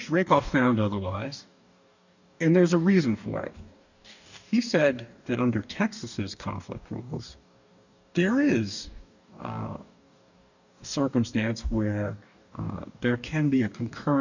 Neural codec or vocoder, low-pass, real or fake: codec, 44.1 kHz, 2.6 kbps, DAC; 7.2 kHz; fake